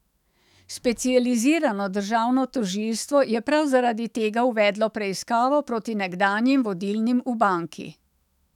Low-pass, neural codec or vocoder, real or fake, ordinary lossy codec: 19.8 kHz; autoencoder, 48 kHz, 128 numbers a frame, DAC-VAE, trained on Japanese speech; fake; none